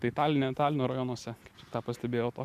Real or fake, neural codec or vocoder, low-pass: real; none; 14.4 kHz